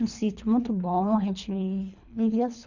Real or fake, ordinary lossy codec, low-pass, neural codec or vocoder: fake; none; 7.2 kHz; codec, 24 kHz, 3 kbps, HILCodec